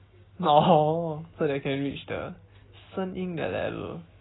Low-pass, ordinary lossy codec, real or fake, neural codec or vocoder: 7.2 kHz; AAC, 16 kbps; real; none